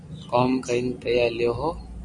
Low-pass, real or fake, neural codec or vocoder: 10.8 kHz; real; none